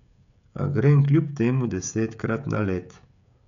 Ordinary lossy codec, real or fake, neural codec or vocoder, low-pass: none; fake; codec, 16 kHz, 16 kbps, FreqCodec, smaller model; 7.2 kHz